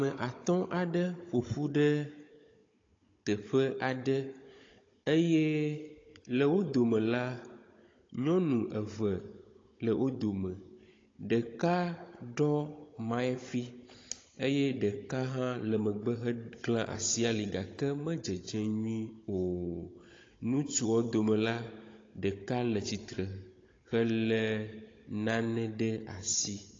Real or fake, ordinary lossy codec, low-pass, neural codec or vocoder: fake; AAC, 32 kbps; 7.2 kHz; codec, 16 kHz, 16 kbps, FunCodec, trained on Chinese and English, 50 frames a second